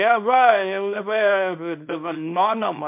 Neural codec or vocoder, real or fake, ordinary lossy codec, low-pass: codec, 24 kHz, 0.9 kbps, WavTokenizer, small release; fake; MP3, 32 kbps; 3.6 kHz